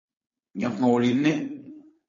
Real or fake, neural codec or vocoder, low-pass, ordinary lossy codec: fake; codec, 16 kHz, 4.8 kbps, FACodec; 7.2 kHz; MP3, 32 kbps